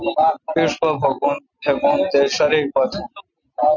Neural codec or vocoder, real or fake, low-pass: none; real; 7.2 kHz